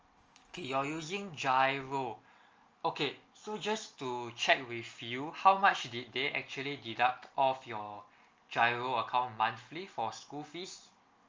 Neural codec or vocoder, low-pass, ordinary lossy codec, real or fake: none; 7.2 kHz; Opus, 24 kbps; real